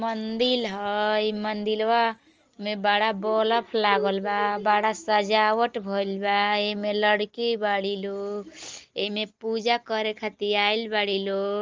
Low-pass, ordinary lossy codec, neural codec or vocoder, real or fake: 7.2 kHz; Opus, 24 kbps; none; real